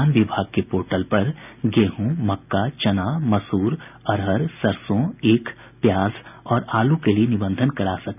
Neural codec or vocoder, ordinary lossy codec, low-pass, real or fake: none; none; 3.6 kHz; real